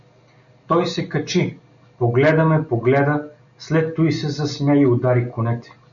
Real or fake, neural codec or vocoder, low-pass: real; none; 7.2 kHz